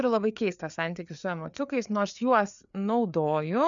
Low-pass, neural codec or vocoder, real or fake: 7.2 kHz; codec, 16 kHz, 4 kbps, FreqCodec, larger model; fake